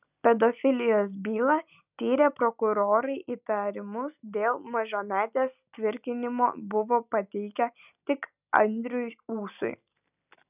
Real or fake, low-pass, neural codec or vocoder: real; 3.6 kHz; none